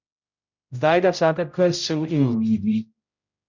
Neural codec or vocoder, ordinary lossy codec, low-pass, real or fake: codec, 16 kHz, 0.5 kbps, X-Codec, HuBERT features, trained on general audio; none; 7.2 kHz; fake